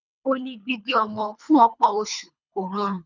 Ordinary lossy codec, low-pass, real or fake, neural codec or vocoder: none; 7.2 kHz; fake; codec, 24 kHz, 3 kbps, HILCodec